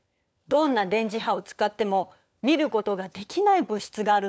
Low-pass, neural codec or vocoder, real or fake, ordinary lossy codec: none; codec, 16 kHz, 4 kbps, FunCodec, trained on LibriTTS, 50 frames a second; fake; none